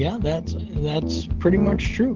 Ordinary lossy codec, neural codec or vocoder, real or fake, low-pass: Opus, 16 kbps; none; real; 7.2 kHz